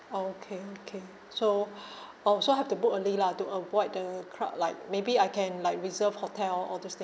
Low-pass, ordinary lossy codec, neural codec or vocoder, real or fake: none; none; none; real